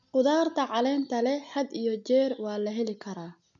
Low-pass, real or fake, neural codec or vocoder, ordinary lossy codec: 7.2 kHz; real; none; none